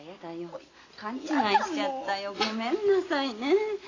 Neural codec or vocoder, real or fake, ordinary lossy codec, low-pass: none; real; AAC, 32 kbps; 7.2 kHz